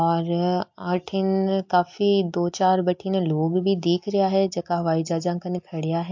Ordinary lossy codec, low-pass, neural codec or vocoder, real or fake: MP3, 48 kbps; 7.2 kHz; none; real